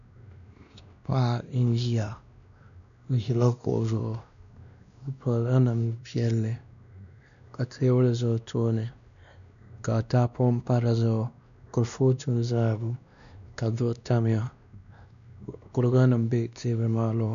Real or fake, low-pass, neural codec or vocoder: fake; 7.2 kHz; codec, 16 kHz, 1 kbps, X-Codec, WavLM features, trained on Multilingual LibriSpeech